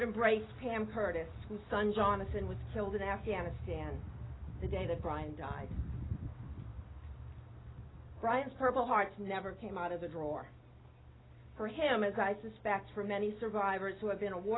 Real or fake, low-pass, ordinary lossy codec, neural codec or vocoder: real; 7.2 kHz; AAC, 16 kbps; none